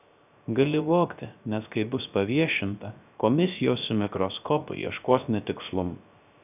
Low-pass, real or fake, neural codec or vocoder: 3.6 kHz; fake; codec, 16 kHz, 0.3 kbps, FocalCodec